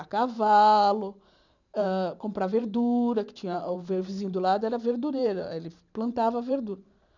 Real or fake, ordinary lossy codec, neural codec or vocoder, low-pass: fake; none; vocoder, 44.1 kHz, 128 mel bands every 512 samples, BigVGAN v2; 7.2 kHz